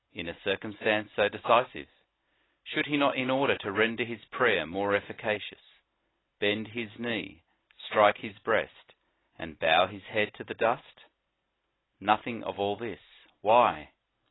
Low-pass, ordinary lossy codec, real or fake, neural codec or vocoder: 7.2 kHz; AAC, 16 kbps; real; none